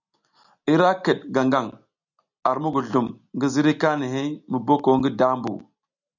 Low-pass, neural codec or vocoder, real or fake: 7.2 kHz; none; real